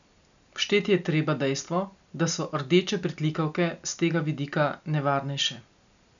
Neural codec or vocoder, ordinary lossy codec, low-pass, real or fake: none; none; 7.2 kHz; real